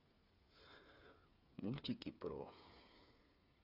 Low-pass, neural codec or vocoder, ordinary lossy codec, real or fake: 5.4 kHz; codec, 16 kHz, 8 kbps, FreqCodec, smaller model; AAC, 48 kbps; fake